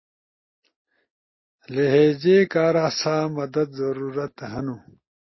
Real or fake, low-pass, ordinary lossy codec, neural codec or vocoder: real; 7.2 kHz; MP3, 24 kbps; none